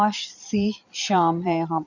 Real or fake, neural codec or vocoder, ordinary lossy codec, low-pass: fake; vocoder, 22.05 kHz, 80 mel bands, Vocos; none; 7.2 kHz